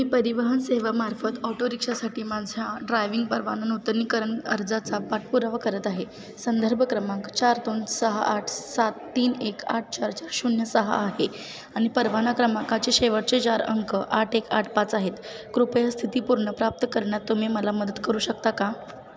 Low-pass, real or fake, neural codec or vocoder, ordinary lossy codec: none; real; none; none